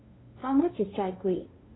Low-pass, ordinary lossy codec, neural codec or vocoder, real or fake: 7.2 kHz; AAC, 16 kbps; codec, 16 kHz, 2 kbps, FunCodec, trained on LibriTTS, 25 frames a second; fake